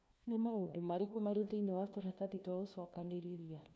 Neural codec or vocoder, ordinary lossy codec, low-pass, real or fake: codec, 16 kHz, 1 kbps, FunCodec, trained on LibriTTS, 50 frames a second; none; none; fake